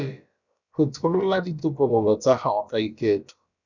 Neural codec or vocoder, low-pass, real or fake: codec, 16 kHz, about 1 kbps, DyCAST, with the encoder's durations; 7.2 kHz; fake